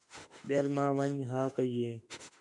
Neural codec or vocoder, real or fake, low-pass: autoencoder, 48 kHz, 32 numbers a frame, DAC-VAE, trained on Japanese speech; fake; 10.8 kHz